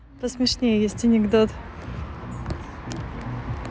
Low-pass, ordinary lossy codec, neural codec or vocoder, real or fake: none; none; none; real